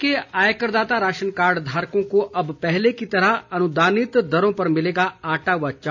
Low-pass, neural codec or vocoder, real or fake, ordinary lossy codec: 7.2 kHz; none; real; none